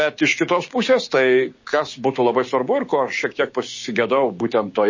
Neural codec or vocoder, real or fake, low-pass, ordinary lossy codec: codec, 16 kHz, 6 kbps, DAC; fake; 7.2 kHz; MP3, 32 kbps